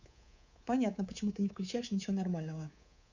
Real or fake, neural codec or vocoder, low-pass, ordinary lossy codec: fake; codec, 24 kHz, 3.1 kbps, DualCodec; 7.2 kHz; none